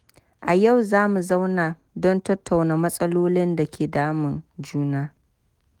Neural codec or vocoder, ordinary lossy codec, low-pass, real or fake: autoencoder, 48 kHz, 128 numbers a frame, DAC-VAE, trained on Japanese speech; Opus, 24 kbps; 19.8 kHz; fake